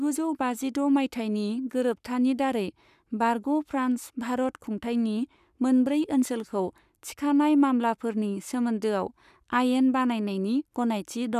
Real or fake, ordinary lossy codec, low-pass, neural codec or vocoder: fake; none; 14.4 kHz; codec, 44.1 kHz, 7.8 kbps, Pupu-Codec